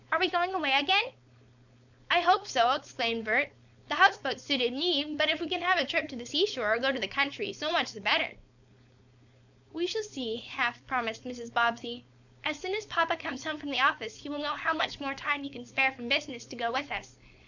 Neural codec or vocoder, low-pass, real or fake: codec, 16 kHz, 4.8 kbps, FACodec; 7.2 kHz; fake